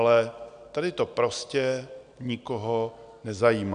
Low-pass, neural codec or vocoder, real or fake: 9.9 kHz; none; real